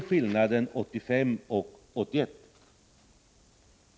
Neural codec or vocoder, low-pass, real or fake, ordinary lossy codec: none; none; real; none